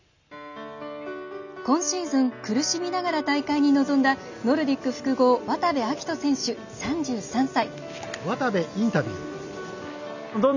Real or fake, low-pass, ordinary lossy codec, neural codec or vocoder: real; 7.2 kHz; none; none